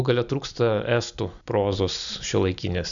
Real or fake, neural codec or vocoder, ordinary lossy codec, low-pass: real; none; MP3, 96 kbps; 7.2 kHz